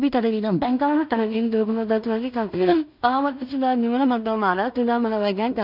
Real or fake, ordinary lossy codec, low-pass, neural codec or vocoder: fake; none; 5.4 kHz; codec, 16 kHz in and 24 kHz out, 0.4 kbps, LongCat-Audio-Codec, two codebook decoder